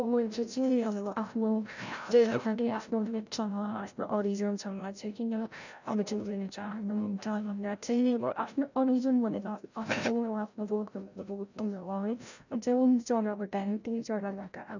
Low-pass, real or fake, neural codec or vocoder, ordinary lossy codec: 7.2 kHz; fake; codec, 16 kHz, 0.5 kbps, FreqCodec, larger model; none